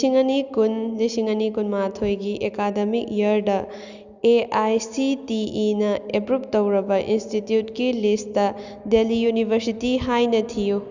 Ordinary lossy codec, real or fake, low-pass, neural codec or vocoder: Opus, 64 kbps; real; 7.2 kHz; none